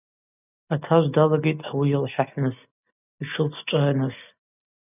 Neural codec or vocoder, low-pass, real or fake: none; 3.6 kHz; real